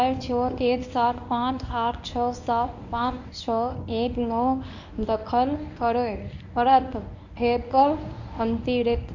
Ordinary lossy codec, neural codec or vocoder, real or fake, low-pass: none; codec, 24 kHz, 0.9 kbps, WavTokenizer, medium speech release version 1; fake; 7.2 kHz